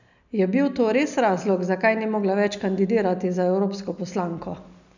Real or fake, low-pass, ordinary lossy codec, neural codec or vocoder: real; 7.2 kHz; none; none